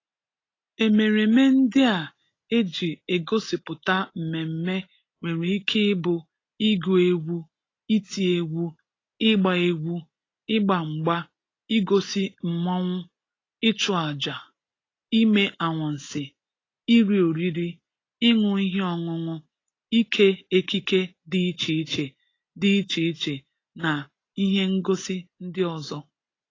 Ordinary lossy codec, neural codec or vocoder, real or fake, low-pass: AAC, 32 kbps; none; real; 7.2 kHz